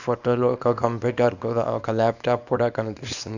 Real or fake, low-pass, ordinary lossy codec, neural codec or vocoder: fake; 7.2 kHz; none; codec, 24 kHz, 0.9 kbps, WavTokenizer, small release